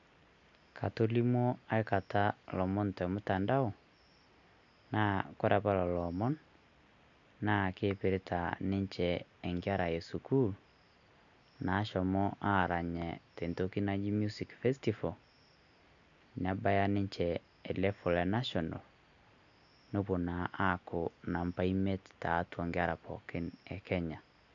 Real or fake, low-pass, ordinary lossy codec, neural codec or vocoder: real; 7.2 kHz; none; none